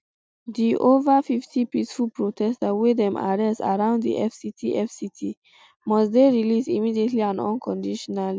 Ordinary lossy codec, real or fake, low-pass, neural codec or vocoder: none; real; none; none